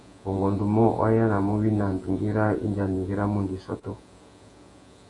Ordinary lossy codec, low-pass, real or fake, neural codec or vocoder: AAC, 48 kbps; 10.8 kHz; fake; vocoder, 48 kHz, 128 mel bands, Vocos